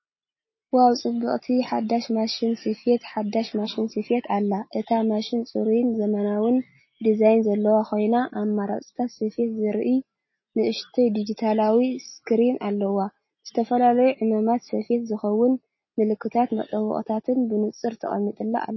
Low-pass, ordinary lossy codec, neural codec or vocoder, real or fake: 7.2 kHz; MP3, 24 kbps; none; real